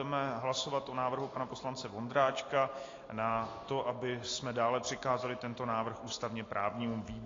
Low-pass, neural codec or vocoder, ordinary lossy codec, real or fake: 7.2 kHz; none; AAC, 32 kbps; real